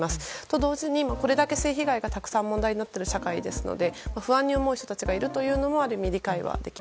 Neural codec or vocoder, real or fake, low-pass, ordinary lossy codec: none; real; none; none